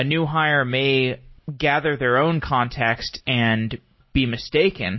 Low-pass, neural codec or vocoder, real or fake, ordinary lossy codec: 7.2 kHz; none; real; MP3, 24 kbps